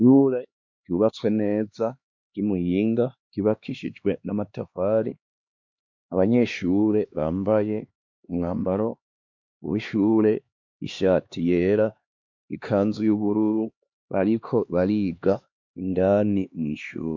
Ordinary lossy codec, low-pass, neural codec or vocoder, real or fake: MP3, 48 kbps; 7.2 kHz; codec, 16 kHz, 2 kbps, X-Codec, HuBERT features, trained on LibriSpeech; fake